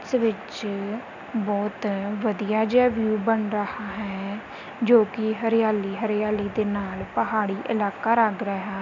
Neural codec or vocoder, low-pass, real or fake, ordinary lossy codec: none; 7.2 kHz; real; none